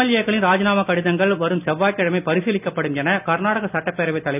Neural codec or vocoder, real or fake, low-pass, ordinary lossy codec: none; real; 3.6 kHz; none